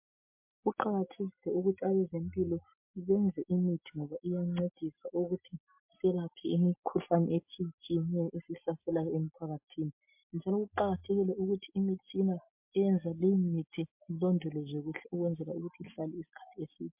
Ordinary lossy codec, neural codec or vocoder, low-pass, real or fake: MP3, 32 kbps; none; 3.6 kHz; real